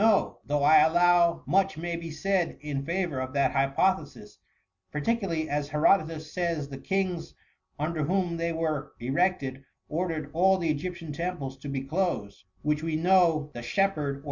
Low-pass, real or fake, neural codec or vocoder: 7.2 kHz; real; none